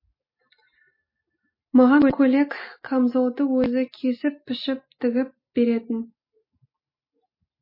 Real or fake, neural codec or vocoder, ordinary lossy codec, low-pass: real; none; MP3, 24 kbps; 5.4 kHz